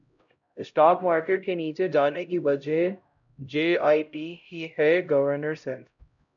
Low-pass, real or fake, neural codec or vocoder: 7.2 kHz; fake; codec, 16 kHz, 0.5 kbps, X-Codec, HuBERT features, trained on LibriSpeech